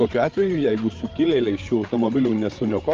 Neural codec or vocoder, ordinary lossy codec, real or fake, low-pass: codec, 16 kHz, 8 kbps, FreqCodec, larger model; Opus, 24 kbps; fake; 7.2 kHz